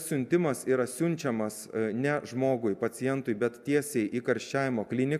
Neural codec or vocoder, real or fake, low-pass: none; real; 14.4 kHz